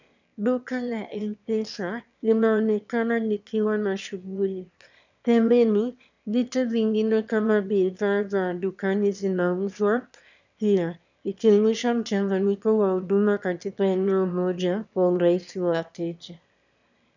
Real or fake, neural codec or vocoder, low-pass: fake; autoencoder, 22.05 kHz, a latent of 192 numbers a frame, VITS, trained on one speaker; 7.2 kHz